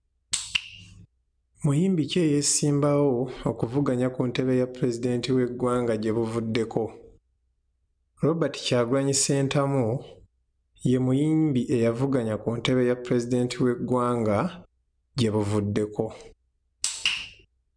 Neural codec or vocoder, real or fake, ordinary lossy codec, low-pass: none; real; none; 9.9 kHz